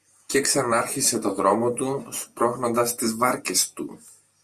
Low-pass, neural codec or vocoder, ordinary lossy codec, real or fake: 14.4 kHz; vocoder, 48 kHz, 128 mel bands, Vocos; Opus, 64 kbps; fake